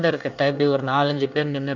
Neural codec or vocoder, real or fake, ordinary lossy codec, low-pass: codec, 24 kHz, 1 kbps, SNAC; fake; none; 7.2 kHz